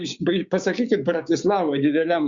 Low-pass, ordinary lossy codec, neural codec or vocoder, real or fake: 7.2 kHz; Opus, 64 kbps; codec, 16 kHz, 6 kbps, DAC; fake